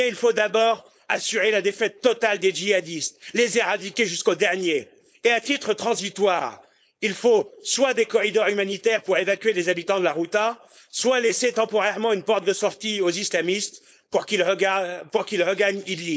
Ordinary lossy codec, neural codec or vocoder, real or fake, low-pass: none; codec, 16 kHz, 4.8 kbps, FACodec; fake; none